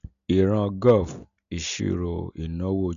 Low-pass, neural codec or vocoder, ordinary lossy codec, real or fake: 7.2 kHz; none; none; real